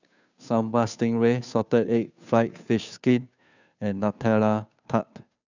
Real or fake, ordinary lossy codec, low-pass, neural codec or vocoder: fake; none; 7.2 kHz; codec, 16 kHz, 2 kbps, FunCodec, trained on Chinese and English, 25 frames a second